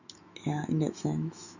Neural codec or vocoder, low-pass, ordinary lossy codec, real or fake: autoencoder, 48 kHz, 128 numbers a frame, DAC-VAE, trained on Japanese speech; 7.2 kHz; none; fake